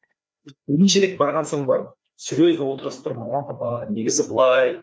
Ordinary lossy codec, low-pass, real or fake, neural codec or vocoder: none; none; fake; codec, 16 kHz, 2 kbps, FreqCodec, larger model